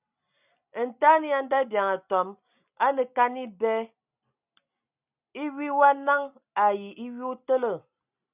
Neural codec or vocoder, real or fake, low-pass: none; real; 3.6 kHz